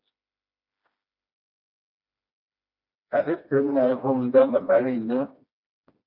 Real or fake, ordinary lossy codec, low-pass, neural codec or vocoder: fake; Opus, 64 kbps; 5.4 kHz; codec, 16 kHz, 1 kbps, FreqCodec, smaller model